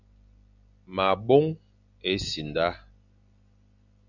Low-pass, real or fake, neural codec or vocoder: 7.2 kHz; real; none